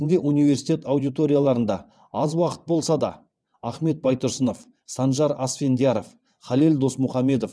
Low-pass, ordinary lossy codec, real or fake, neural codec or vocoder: none; none; fake; vocoder, 22.05 kHz, 80 mel bands, WaveNeXt